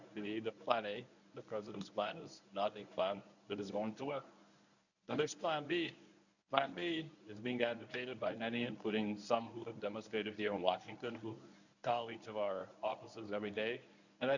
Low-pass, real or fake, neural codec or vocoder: 7.2 kHz; fake; codec, 24 kHz, 0.9 kbps, WavTokenizer, medium speech release version 1